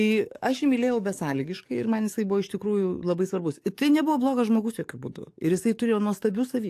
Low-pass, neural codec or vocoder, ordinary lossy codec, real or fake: 14.4 kHz; codec, 44.1 kHz, 7.8 kbps, DAC; AAC, 64 kbps; fake